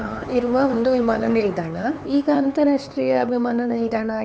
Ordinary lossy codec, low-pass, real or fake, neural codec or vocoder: none; none; fake; codec, 16 kHz, 4 kbps, X-Codec, HuBERT features, trained on LibriSpeech